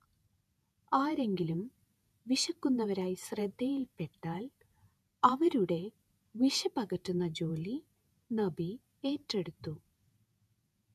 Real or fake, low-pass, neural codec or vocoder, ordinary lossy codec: fake; 14.4 kHz; vocoder, 48 kHz, 128 mel bands, Vocos; none